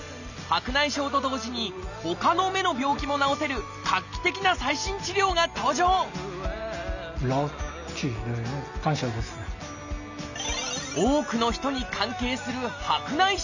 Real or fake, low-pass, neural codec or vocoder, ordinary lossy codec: real; 7.2 kHz; none; none